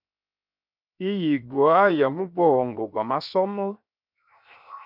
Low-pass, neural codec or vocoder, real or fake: 5.4 kHz; codec, 16 kHz, 0.7 kbps, FocalCodec; fake